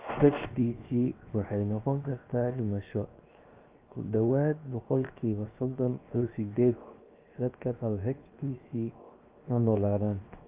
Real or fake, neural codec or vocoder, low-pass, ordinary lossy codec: fake; codec, 16 kHz, 0.7 kbps, FocalCodec; 3.6 kHz; Opus, 24 kbps